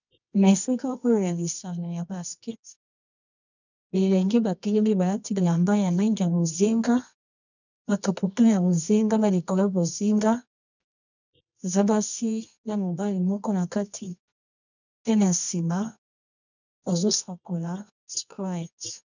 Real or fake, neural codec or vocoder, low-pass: fake; codec, 24 kHz, 0.9 kbps, WavTokenizer, medium music audio release; 7.2 kHz